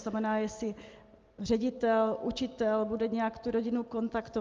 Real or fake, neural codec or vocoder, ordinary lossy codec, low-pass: real; none; Opus, 32 kbps; 7.2 kHz